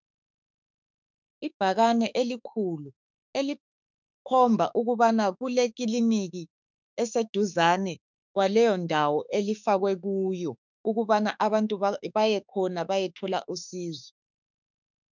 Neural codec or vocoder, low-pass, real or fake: autoencoder, 48 kHz, 32 numbers a frame, DAC-VAE, trained on Japanese speech; 7.2 kHz; fake